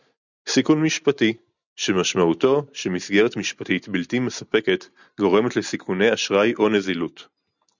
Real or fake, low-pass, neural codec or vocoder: real; 7.2 kHz; none